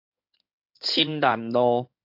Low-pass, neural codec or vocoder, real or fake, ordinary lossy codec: 5.4 kHz; codec, 16 kHz in and 24 kHz out, 2.2 kbps, FireRedTTS-2 codec; fake; MP3, 48 kbps